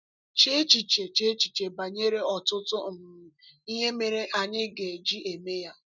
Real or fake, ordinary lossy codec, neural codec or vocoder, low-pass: real; none; none; 7.2 kHz